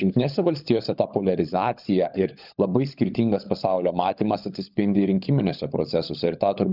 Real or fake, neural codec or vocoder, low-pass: fake; codec, 16 kHz, 16 kbps, FunCodec, trained on LibriTTS, 50 frames a second; 5.4 kHz